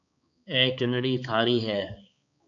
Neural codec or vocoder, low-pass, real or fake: codec, 16 kHz, 4 kbps, X-Codec, HuBERT features, trained on balanced general audio; 7.2 kHz; fake